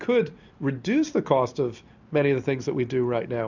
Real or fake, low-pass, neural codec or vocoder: real; 7.2 kHz; none